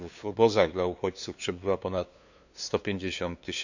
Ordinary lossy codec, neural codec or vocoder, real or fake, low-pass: none; codec, 16 kHz, 2 kbps, FunCodec, trained on LibriTTS, 25 frames a second; fake; 7.2 kHz